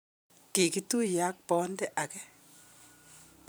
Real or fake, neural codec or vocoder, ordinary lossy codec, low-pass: fake; vocoder, 44.1 kHz, 128 mel bands every 512 samples, BigVGAN v2; none; none